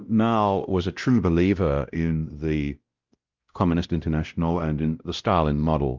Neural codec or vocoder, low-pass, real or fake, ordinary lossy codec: codec, 16 kHz, 0.5 kbps, X-Codec, WavLM features, trained on Multilingual LibriSpeech; 7.2 kHz; fake; Opus, 32 kbps